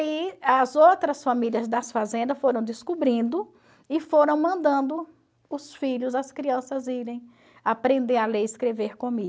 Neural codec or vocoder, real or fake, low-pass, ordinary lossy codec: none; real; none; none